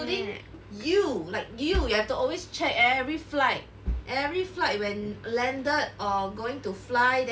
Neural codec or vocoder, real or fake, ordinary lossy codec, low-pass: none; real; none; none